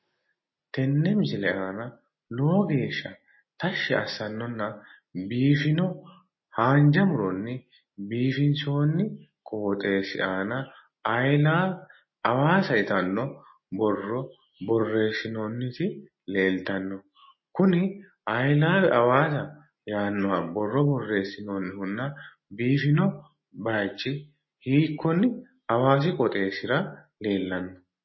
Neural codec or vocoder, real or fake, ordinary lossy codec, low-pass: none; real; MP3, 24 kbps; 7.2 kHz